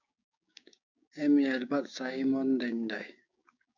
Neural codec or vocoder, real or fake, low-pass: codec, 16 kHz, 6 kbps, DAC; fake; 7.2 kHz